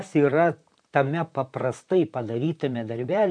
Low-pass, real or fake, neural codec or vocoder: 9.9 kHz; fake; vocoder, 44.1 kHz, 128 mel bands, Pupu-Vocoder